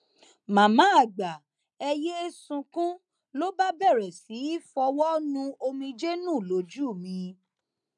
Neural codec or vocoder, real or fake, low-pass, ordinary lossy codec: none; real; 10.8 kHz; none